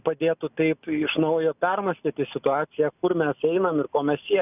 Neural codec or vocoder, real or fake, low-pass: none; real; 3.6 kHz